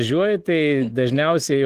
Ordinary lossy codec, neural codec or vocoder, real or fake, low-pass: Opus, 16 kbps; autoencoder, 48 kHz, 128 numbers a frame, DAC-VAE, trained on Japanese speech; fake; 14.4 kHz